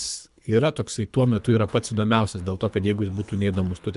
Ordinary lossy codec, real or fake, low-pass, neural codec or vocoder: MP3, 96 kbps; fake; 10.8 kHz; codec, 24 kHz, 3 kbps, HILCodec